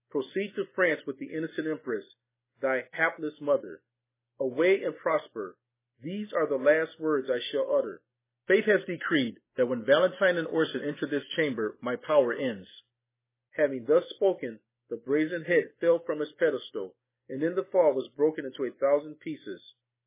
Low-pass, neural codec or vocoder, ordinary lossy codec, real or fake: 3.6 kHz; none; MP3, 16 kbps; real